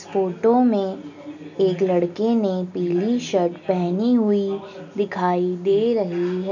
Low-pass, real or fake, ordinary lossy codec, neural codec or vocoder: 7.2 kHz; real; none; none